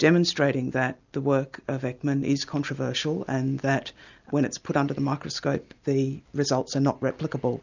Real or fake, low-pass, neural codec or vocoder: real; 7.2 kHz; none